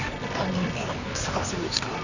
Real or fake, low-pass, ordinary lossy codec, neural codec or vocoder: fake; 7.2 kHz; none; codec, 24 kHz, 0.9 kbps, WavTokenizer, medium music audio release